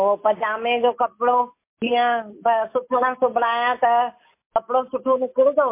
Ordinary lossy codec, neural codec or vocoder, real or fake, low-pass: MP3, 24 kbps; none; real; 3.6 kHz